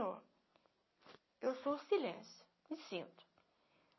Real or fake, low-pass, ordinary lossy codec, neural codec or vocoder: real; 7.2 kHz; MP3, 24 kbps; none